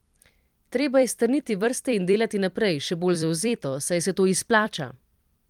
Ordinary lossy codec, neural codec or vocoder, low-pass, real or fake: Opus, 32 kbps; vocoder, 44.1 kHz, 128 mel bands every 256 samples, BigVGAN v2; 19.8 kHz; fake